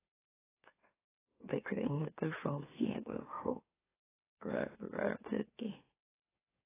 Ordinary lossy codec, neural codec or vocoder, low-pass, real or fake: AAC, 16 kbps; autoencoder, 44.1 kHz, a latent of 192 numbers a frame, MeloTTS; 3.6 kHz; fake